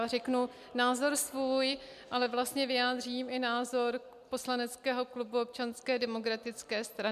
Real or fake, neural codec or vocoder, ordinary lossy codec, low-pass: real; none; MP3, 96 kbps; 14.4 kHz